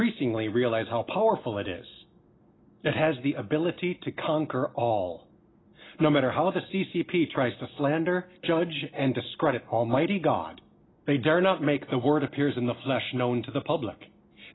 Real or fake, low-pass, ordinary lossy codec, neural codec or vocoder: real; 7.2 kHz; AAC, 16 kbps; none